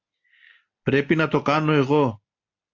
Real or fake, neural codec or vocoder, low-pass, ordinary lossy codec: real; none; 7.2 kHz; AAC, 48 kbps